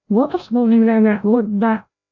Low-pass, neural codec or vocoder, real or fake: 7.2 kHz; codec, 16 kHz, 0.5 kbps, FreqCodec, larger model; fake